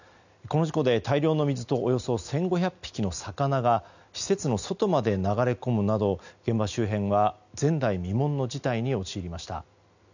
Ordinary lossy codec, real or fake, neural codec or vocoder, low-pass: none; real; none; 7.2 kHz